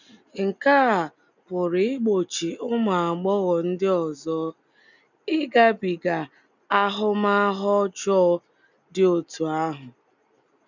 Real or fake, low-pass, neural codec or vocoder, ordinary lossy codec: real; 7.2 kHz; none; none